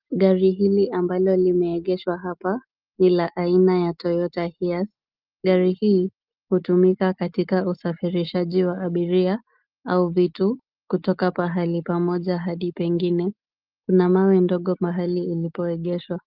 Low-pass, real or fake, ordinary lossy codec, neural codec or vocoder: 5.4 kHz; real; Opus, 24 kbps; none